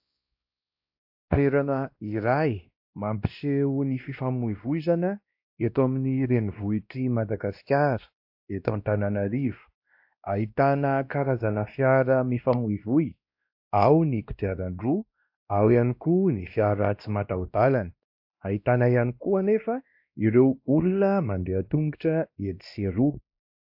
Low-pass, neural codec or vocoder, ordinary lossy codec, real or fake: 5.4 kHz; codec, 16 kHz, 1 kbps, X-Codec, WavLM features, trained on Multilingual LibriSpeech; AAC, 48 kbps; fake